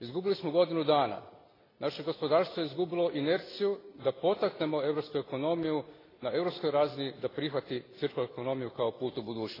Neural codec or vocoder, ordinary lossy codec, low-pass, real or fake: none; AAC, 24 kbps; 5.4 kHz; real